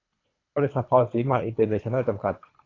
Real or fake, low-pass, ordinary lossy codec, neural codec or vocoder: fake; 7.2 kHz; AAC, 32 kbps; codec, 24 kHz, 3 kbps, HILCodec